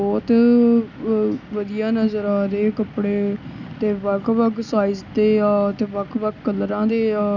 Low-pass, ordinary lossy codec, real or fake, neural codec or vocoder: 7.2 kHz; none; real; none